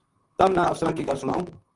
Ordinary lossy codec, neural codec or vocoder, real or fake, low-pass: Opus, 32 kbps; vocoder, 44.1 kHz, 128 mel bands, Pupu-Vocoder; fake; 10.8 kHz